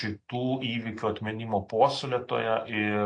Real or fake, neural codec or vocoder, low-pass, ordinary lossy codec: real; none; 9.9 kHz; AAC, 48 kbps